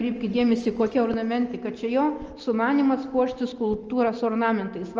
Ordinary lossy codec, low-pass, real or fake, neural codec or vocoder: Opus, 24 kbps; 7.2 kHz; real; none